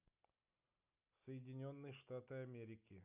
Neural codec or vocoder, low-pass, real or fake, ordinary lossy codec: none; 3.6 kHz; real; Opus, 64 kbps